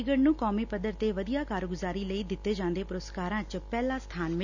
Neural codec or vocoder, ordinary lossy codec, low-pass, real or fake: none; none; 7.2 kHz; real